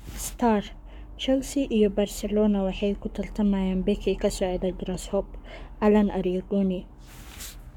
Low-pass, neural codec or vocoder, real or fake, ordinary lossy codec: 19.8 kHz; codec, 44.1 kHz, 7.8 kbps, Pupu-Codec; fake; none